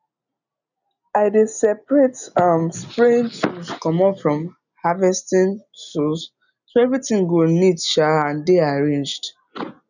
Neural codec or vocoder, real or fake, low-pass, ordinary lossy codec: none; real; 7.2 kHz; none